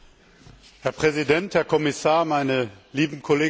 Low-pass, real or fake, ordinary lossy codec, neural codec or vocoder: none; real; none; none